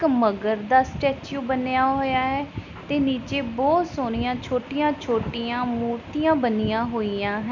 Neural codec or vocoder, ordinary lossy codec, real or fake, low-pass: none; none; real; 7.2 kHz